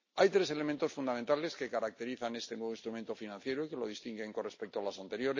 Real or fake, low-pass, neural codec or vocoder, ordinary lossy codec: real; 7.2 kHz; none; MP3, 32 kbps